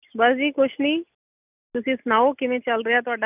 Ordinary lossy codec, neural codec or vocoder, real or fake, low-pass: none; none; real; 3.6 kHz